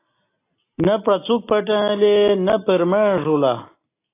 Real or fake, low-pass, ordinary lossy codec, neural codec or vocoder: real; 3.6 kHz; AAC, 24 kbps; none